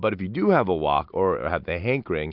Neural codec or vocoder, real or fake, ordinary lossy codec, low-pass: none; real; Opus, 64 kbps; 5.4 kHz